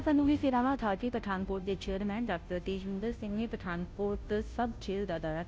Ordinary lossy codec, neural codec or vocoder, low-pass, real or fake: none; codec, 16 kHz, 0.5 kbps, FunCodec, trained on Chinese and English, 25 frames a second; none; fake